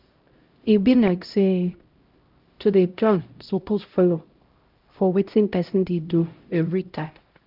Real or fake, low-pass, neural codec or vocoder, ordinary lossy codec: fake; 5.4 kHz; codec, 16 kHz, 0.5 kbps, X-Codec, HuBERT features, trained on LibriSpeech; Opus, 24 kbps